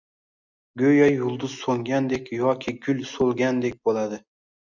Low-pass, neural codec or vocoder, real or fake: 7.2 kHz; none; real